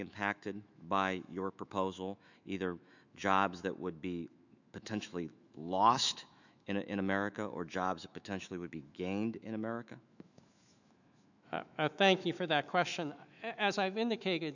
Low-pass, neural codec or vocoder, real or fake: 7.2 kHz; autoencoder, 48 kHz, 128 numbers a frame, DAC-VAE, trained on Japanese speech; fake